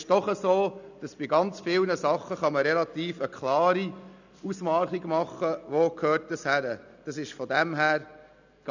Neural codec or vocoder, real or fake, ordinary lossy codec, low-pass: none; real; none; 7.2 kHz